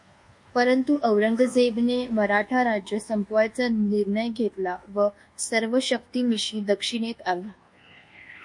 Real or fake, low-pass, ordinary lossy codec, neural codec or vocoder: fake; 10.8 kHz; MP3, 48 kbps; codec, 24 kHz, 1.2 kbps, DualCodec